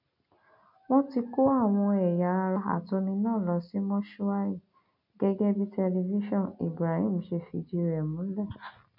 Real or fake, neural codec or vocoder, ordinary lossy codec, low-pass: real; none; none; 5.4 kHz